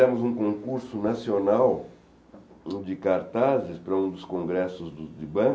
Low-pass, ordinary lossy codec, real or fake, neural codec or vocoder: none; none; real; none